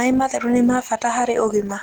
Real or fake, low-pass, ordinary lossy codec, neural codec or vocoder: real; 19.8 kHz; Opus, 16 kbps; none